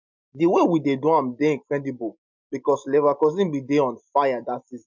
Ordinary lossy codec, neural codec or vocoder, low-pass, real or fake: MP3, 64 kbps; none; 7.2 kHz; real